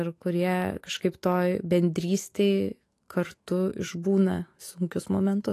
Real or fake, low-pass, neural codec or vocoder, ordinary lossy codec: fake; 14.4 kHz; autoencoder, 48 kHz, 128 numbers a frame, DAC-VAE, trained on Japanese speech; AAC, 48 kbps